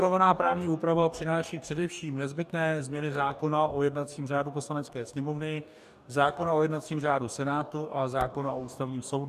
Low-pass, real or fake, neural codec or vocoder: 14.4 kHz; fake; codec, 44.1 kHz, 2.6 kbps, DAC